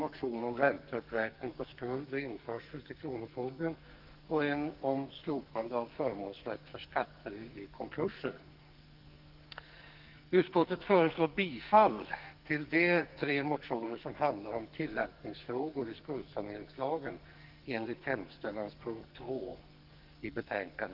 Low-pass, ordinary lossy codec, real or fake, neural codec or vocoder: 5.4 kHz; Opus, 32 kbps; fake; codec, 44.1 kHz, 2.6 kbps, SNAC